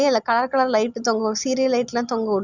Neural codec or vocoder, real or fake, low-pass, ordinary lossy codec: none; real; 7.2 kHz; Opus, 64 kbps